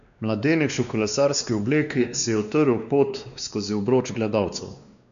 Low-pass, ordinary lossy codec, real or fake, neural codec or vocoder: 7.2 kHz; none; fake; codec, 16 kHz, 2 kbps, X-Codec, WavLM features, trained on Multilingual LibriSpeech